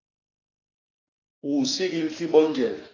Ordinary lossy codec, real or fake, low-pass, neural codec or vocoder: AAC, 32 kbps; fake; 7.2 kHz; autoencoder, 48 kHz, 32 numbers a frame, DAC-VAE, trained on Japanese speech